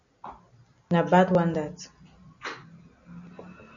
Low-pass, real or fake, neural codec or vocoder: 7.2 kHz; real; none